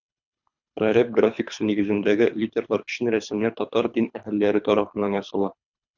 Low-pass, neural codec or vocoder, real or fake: 7.2 kHz; codec, 24 kHz, 3 kbps, HILCodec; fake